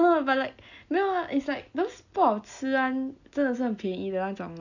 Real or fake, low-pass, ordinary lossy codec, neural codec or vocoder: real; 7.2 kHz; none; none